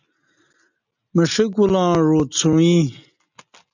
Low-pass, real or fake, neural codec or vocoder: 7.2 kHz; real; none